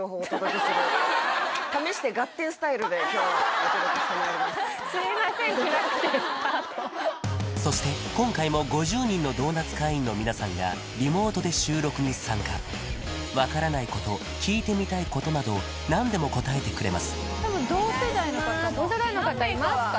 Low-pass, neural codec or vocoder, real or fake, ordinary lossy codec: none; none; real; none